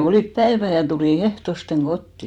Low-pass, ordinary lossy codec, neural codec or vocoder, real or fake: 19.8 kHz; none; vocoder, 44.1 kHz, 128 mel bands every 512 samples, BigVGAN v2; fake